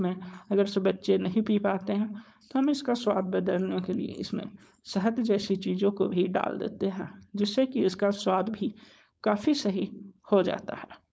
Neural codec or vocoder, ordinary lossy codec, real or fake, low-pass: codec, 16 kHz, 4.8 kbps, FACodec; none; fake; none